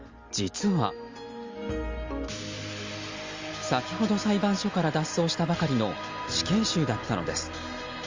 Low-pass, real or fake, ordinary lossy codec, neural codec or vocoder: 7.2 kHz; real; Opus, 32 kbps; none